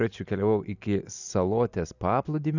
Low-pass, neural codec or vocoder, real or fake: 7.2 kHz; none; real